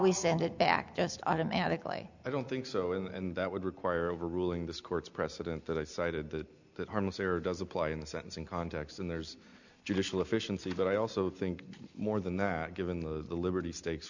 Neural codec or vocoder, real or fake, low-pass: none; real; 7.2 kHz